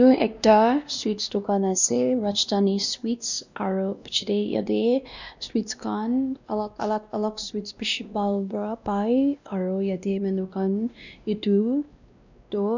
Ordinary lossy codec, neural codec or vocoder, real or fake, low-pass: none; codec, 16 kHz, 1 kbps, X-Codec, WavLM features, trained on Multilingual LibriSpeech; fake; 7.2 kHz